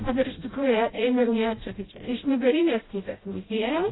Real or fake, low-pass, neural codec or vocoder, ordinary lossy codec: fake; 7.2 kHz; codec, 16 kHz, 0.5 kbps, FreqCodec, smaller model; AAC, 16 kbps